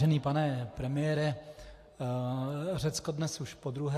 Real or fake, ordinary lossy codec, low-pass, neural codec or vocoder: real; AAC, 64 kbps; 14.4 kHz; none